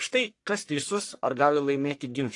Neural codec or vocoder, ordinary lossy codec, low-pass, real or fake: codec, 44.1 kHz, 1.7 kbps, Pupu-Codec; AAC, 48 kbps; 10.8 kHz; fake